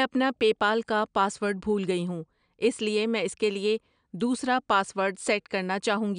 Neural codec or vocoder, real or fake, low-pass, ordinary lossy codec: none; real; 9.9 kHz; none